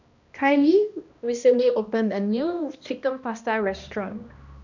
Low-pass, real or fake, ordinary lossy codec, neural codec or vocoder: 7.2 kHz; fake; none; codec, 16 kHz, 1 kbps, X-Codec, HuBERT features, trained on balanced general audio